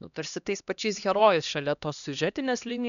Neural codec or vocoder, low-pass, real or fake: codec, 16 kHz, 1 kbps, X-Codec, HuBERT features, trained on LibriSpeech; 7.2 kHz; fake